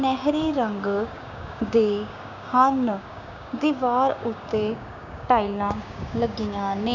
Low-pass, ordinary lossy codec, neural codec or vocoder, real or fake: 7.2 kHz; none; codec, 16 kHz, 6 kbps, DAC; fake